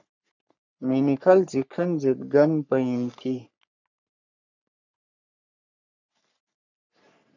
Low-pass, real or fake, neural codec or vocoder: 7.2 kHz; fake; codec, 44.1 kHz, 3.4 kbps, Pupu-Codec